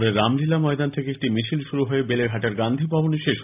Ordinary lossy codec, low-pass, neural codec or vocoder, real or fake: AAC, 32 kbps; 3.6 kHz; none; real